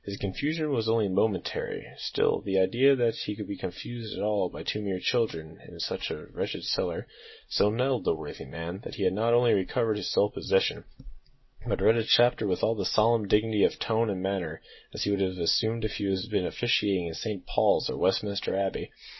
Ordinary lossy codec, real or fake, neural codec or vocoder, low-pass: MP3, 24 kbps; real; none; 7.2 kHz